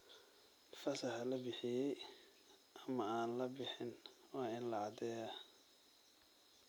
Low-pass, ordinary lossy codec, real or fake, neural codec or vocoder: none; none; real; none